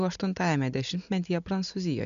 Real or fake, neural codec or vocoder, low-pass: real; none; 7.2 kHz